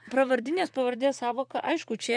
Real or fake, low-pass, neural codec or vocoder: fake; 9.9 kHz; vocoder, 44.1 kHz, 128 mel bands, Pupu-Vocoder